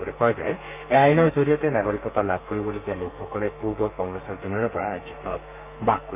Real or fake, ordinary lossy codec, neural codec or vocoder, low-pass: fake; none; codec, 32 kHz, 1.9 kbps, SNAC; 3.6 kHz